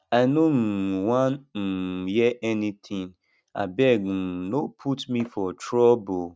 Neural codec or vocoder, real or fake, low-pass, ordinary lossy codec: none; real; none; none